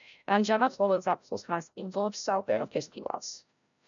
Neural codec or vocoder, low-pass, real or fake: codec, 16 kHz, 0.5 kbps, FreqCodec, larger model; 7.2 kHz; fake